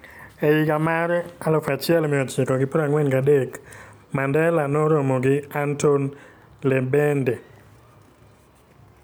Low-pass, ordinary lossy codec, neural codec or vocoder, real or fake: none; none; none; real